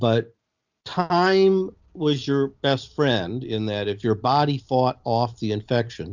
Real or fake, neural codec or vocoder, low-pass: real; none; 7.2 kHz